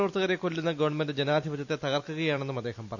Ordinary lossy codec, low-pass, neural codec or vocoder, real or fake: none; 7.2 kHz; none; real